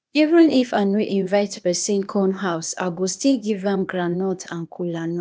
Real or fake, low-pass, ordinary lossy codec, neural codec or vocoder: fake; none; none; codec, 16 kHz, 0.8 kbps, ZipCodec